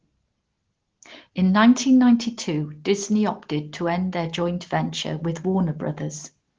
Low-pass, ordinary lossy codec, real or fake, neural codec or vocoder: 7.2 kHz; Opus, 16 kbps; real; none